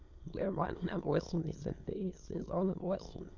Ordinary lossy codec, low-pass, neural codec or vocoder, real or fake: Opus, 64 kbps; 7.2 kHz; autoencoder, 22.05 kHz, a latent of 192 numbers a frame, VITS, trained on many speakers; fake